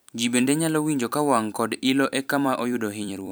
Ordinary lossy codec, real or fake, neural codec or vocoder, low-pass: none; fake; vocoder, 44.1 kHz, 128 mel bands every 256 samples, BigVGAN v2; none